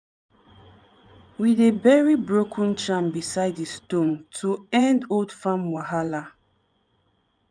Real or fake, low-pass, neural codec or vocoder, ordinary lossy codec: fake; 9.9 kHz; vocoder, 24 kHz, 100 mel bands, Vocos; none